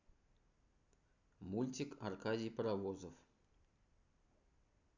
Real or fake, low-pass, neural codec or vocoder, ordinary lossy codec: real; 7.2 kHz; none; none